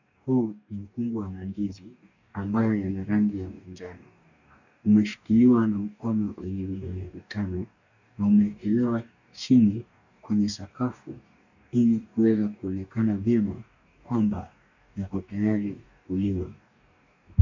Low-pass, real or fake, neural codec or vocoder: 7.2 kHz; fake; codec, 44.1 kHz, 2.6 kbps, DAC